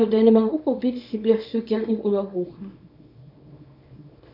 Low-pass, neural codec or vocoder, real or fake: 5.4 kHz; codec, 24 kHz, 0.9 kbps, WavTokenizer, small release; fake